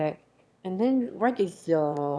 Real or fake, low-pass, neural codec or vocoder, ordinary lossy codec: fake; none; autoencoder, 22.05 kHz, a latent of 192 numbers a frame, VITS, trained on one speaker; none